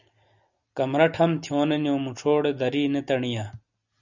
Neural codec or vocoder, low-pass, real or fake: none; 7.2 kHz; real